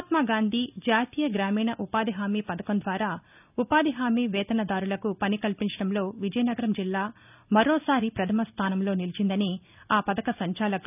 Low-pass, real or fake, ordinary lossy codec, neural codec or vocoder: 3.6 kHz; real; none; none